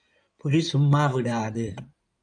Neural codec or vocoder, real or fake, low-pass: codec, 16 kHz in and 24 kHz out, 2.2 kbps, FireRedTTS-2 codec; fake; 9.9 kHz